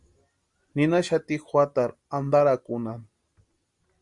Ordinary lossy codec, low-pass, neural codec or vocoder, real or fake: Opus, 64 kbps; 10.8 kHz; none; real